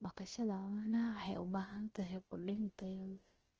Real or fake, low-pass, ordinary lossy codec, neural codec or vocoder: fake; 7.2 kHz; Opus, 16 kbps; codec, 16 kHz, about 1 kbps, DyCAST, with the encoder's durations